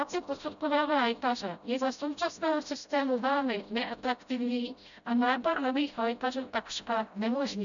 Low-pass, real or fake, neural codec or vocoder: 7.2 kHz; fake; codec, 16 kHz, 0.5 kbps, FreqCodec, smaller model